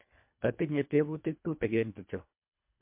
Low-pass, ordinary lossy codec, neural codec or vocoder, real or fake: 3.6 kHz; MP3, 32 kbps; codec, 24 kHz, 1.5 kbps, HILCodec; fake